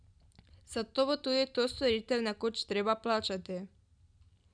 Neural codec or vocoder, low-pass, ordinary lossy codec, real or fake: none; 9.9 kHz; none; real